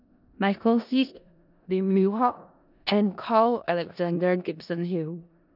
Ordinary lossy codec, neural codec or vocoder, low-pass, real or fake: none; codec, 16 kHz in and 24 kHz out, 0.4 kbps, LongCat-Audio-Codec, four codebook decoder; 5.4 kHz; fake